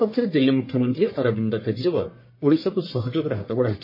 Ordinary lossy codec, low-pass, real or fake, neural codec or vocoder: MP3, 24 kbps; 5.4 kHz; fake; codec, 44.1 kHz, 1.7 kbps, Pupu-Codec